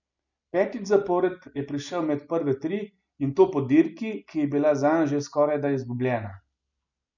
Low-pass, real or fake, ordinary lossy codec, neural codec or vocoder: 7.2 kHz; real; none; none